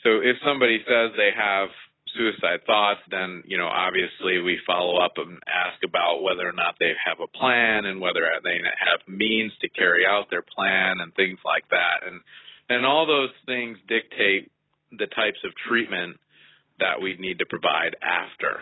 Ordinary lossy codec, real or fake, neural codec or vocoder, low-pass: AAC, 16 kbps; real; none; 7.2 kHz